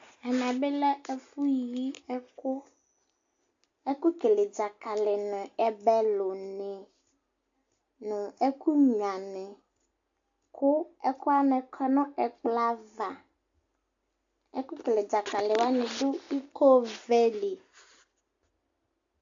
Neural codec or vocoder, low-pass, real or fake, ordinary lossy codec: none; 7.2 kHz; real; AAC, 64 kbps